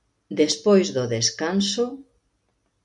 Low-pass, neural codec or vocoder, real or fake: 10.8 kHz; none; real